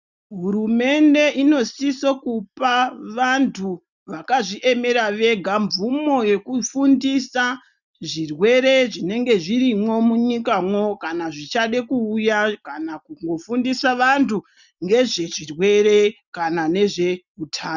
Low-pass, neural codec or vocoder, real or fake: 7.2 kHz; none; real